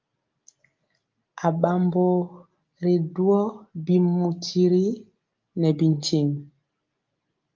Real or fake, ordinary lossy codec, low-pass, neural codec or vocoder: real; Opus, 24 kbps; 7.2 kHz; none